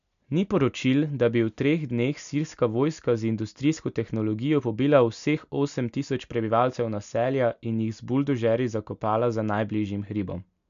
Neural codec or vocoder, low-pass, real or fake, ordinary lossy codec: none; 7.2 kHz; real; none